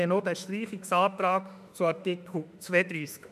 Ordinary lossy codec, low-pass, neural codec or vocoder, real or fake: none; 14.4 kHz; autoencoder, 48 kHz, 32 numbers a frame, DAC-VAE, trained on Japanese speech; fake